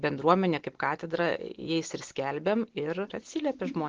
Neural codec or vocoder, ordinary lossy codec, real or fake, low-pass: none; Opus, 16 kbps; real; 7.2 kHz